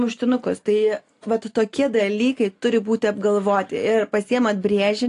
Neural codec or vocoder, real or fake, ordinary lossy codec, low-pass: none; real; AAC, 48 kbps; 10.8 kHz